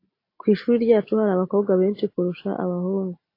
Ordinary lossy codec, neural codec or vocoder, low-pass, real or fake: AAC, 32 kbps; none; 5.4 kHz; real